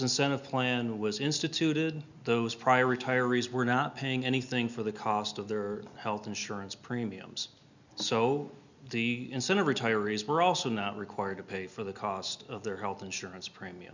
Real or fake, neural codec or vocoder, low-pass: real; none; 7.2 kHz